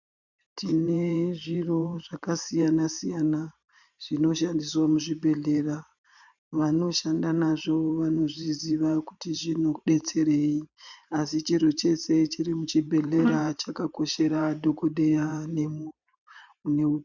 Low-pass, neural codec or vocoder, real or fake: 7.2 kHz; vocoder, 44.1 kHz, 128 mel bands every 512 samples, BigVGAN v2; fake